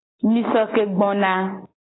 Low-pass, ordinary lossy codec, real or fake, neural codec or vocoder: 7.2 kHz; AAC, 16 kbps; real; none